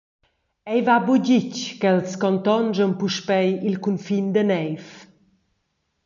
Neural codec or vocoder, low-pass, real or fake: none; 7.2 kHz; real